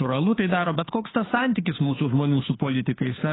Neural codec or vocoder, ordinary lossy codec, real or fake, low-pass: codec, 16 kHz, 2 kbps, FunCodec, trained on Chinese and English, 25 frames a second; AAC, 16 kbps; fake; 7.2 kHz